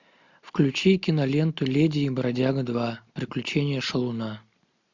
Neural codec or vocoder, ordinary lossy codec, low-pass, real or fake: none; MP3, 64 kbps; 7.2 kHz; real